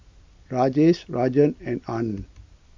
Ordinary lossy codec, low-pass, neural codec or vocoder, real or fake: MP3, 48 kbps; 7.2 kHz; none; real